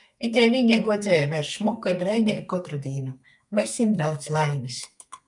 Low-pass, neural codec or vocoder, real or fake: 10.8 kHz; codec, 32 kHz, 1.9 kbps, SNAC; fake